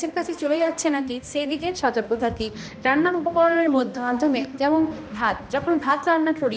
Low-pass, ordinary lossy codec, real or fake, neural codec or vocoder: none; none; fake; codec, 16 kHz, 1 kbps, X-Codec, HuBERT features, trained on balanced general audio